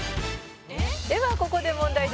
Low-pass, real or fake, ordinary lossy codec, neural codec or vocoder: none; real; none; none